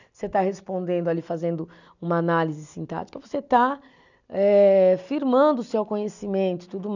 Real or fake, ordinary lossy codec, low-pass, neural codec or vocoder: real; none; 7.2 kHz; none